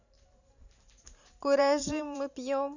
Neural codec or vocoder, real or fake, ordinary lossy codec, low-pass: vocoder, 44.1 kHz, 128 mel bands every 512 samples, BigVGAN v2; fake; none; 7.2 kHz